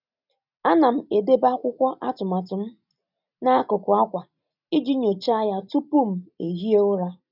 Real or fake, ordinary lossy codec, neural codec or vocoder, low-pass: real; none; none; 5.4 kHz